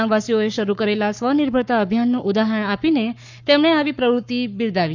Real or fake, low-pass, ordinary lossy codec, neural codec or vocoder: fake; 7.2 kHz; none; codec, 44.1 kHz, 7.8 kbps, Pupu-Codec